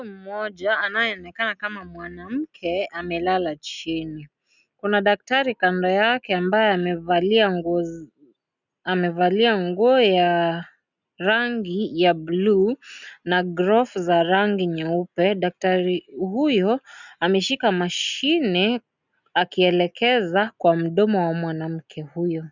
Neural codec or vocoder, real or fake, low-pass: none; real; 7.2 kHz